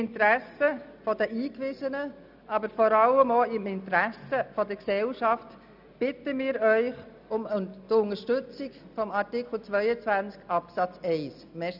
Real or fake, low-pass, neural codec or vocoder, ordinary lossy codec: real; 5.4 kHz; none; none